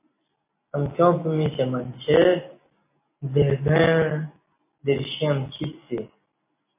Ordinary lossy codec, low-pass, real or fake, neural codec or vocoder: MP3, 24 kbps; 3.6 kHz; real; none